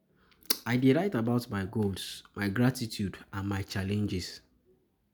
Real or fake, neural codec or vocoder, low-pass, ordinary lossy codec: real; none; none; none